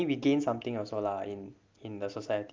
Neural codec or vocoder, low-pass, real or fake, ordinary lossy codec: none; 7.2 kHz; real; Opus, 32 kbps